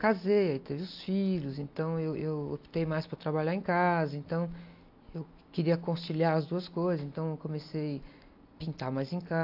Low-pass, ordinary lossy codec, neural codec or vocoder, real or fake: 5.4 kHz; AAC, 48 kbps; none; real